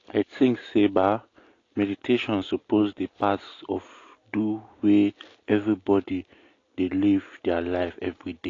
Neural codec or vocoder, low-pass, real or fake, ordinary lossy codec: none; 7.2 kHz; real; AAC, 32 kbps